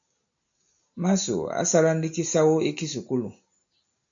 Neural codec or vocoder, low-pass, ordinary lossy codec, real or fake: none; 7.2 kHz; AAC, 64 kbps; real